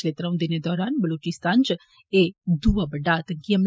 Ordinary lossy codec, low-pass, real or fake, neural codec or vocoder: none; none; real; none